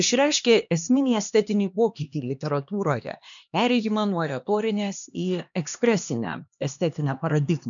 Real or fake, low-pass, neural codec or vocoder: fake; 7.2 kHz; codec, 16 kHz, 2 kbps, X-Codec, HuBERT features, trained on LibriSpeech